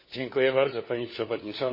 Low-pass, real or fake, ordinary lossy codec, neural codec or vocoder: 5.4 kHz; fake; MP3, 24 kbps; codec, 16 kHz, 4 kbps, FunCodec, trained on LibriTTS, 50 frames a second